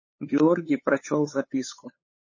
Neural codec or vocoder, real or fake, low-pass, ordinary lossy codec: codec, 16 kHz, 8 kbps, FunCodec, trained on LibriTTS, 25 frames a second; fake; 7.2 kHz; MP3, 32 kbps